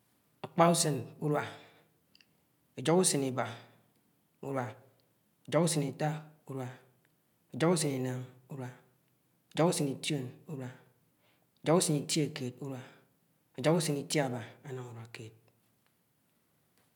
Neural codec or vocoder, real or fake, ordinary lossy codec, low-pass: none; real; none; 19.8 kHz